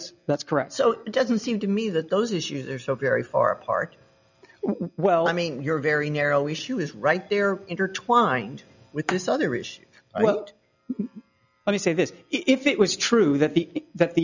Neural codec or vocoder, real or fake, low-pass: none; real; 7.2 kHz